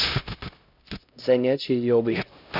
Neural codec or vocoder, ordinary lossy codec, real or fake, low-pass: codec, 16 kHz, 0.5 kbps, X-Codec, HuBERT features, trained on LibriSpeech; none; fake; 5.4 kHz